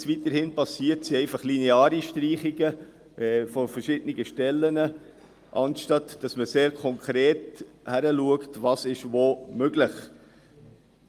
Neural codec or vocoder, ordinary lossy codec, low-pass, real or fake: none; Opus, 32 kbps; 14.4 kHz; real